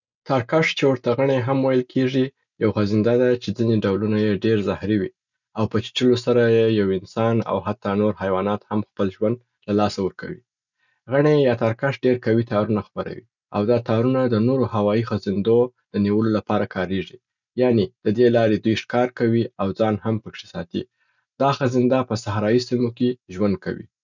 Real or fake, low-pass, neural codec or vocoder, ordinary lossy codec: real; 7.2 kHz; none; none